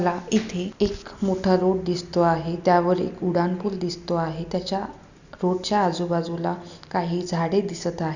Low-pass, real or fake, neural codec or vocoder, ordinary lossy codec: 7.2 kHz; real; none; none